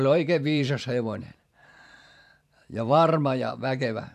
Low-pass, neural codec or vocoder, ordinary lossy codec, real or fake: 14.4 kHz; vocoder, 44.1 kHz, 128 mel bands every 512 samples, BigVGAN v2; MP3, 96 kbps; fake